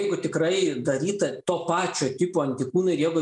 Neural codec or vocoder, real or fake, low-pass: none; real; 10.8 kHz